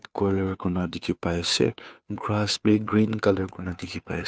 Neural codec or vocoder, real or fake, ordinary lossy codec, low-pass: codec, 16 kHz, 2 kbps, FunCodec, trained on Chinese and English, 25 frames a second; fake; none; none